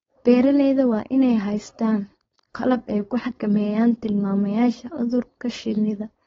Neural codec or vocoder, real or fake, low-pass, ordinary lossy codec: codec, 16 kHz, 4.8 kbps, FACodec; fake; 7.2 kHz; AAC, 24 kbps